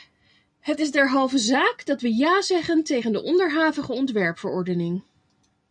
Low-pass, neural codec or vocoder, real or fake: 9.9 kHz; none; real